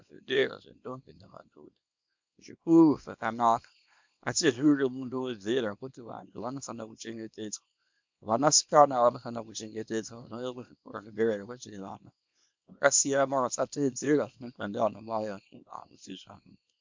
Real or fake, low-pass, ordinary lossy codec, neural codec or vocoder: fake; 7.2 kHz; MP3, 64 kbps; codec, 24 kHz, 0.9 kbps, WavTokenizer, small release